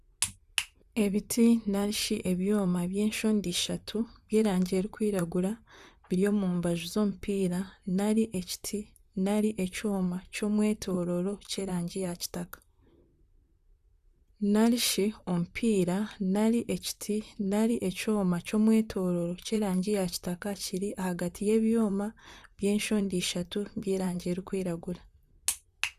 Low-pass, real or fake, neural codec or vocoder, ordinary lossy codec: 14.4 kHz; fake; vocoder, 44.1 kHz, 128 mel bands, Pupu-Vocoder; Opus, 64 kbps